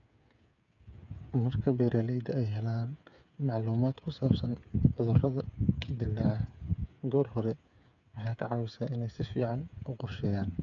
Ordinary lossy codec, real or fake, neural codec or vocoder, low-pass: none; fake; codec, 16 kHz, 8 kbps, FreqCodec, smaller model; 7.2 kHz